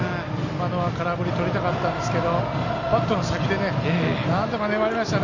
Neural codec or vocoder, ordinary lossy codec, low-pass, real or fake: none; none; 7.2 kHz; real